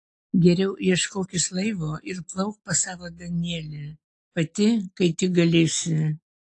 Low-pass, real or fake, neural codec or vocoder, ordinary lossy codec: 10.8 kHz; real; none; AAC, 48 kbps